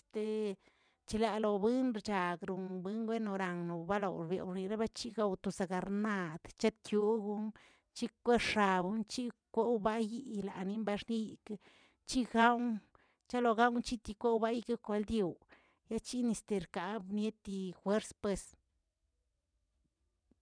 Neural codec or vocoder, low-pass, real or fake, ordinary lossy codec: vocoder, 22.05 kHz, 80 mel bands, WaveNeXt; 9.9 kHz; fake; none